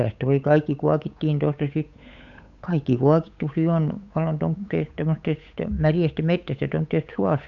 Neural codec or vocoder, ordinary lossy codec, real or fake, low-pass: codec, 16 kHz, 8 kbps, FunCodec, trained on Chinese and English, 25 frames a second; none; fake; 7.2 kHz